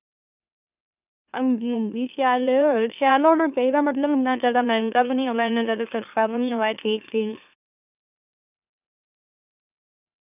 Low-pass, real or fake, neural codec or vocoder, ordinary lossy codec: 3.6 kHz; fake; autoencoder, 44.1 kHz, a latent of 192 numbers a frame, MeloTTS; none